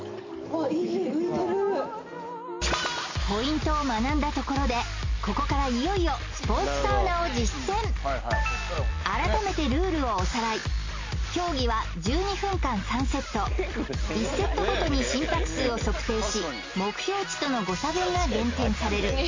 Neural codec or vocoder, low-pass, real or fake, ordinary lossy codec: none; 7.2 kHz; real; MP3, 32 kbps